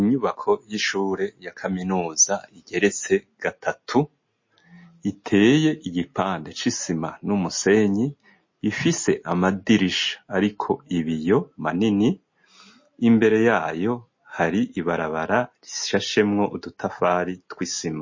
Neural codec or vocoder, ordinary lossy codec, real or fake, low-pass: none; MP3, 32 kbps; real; 7.2 kHz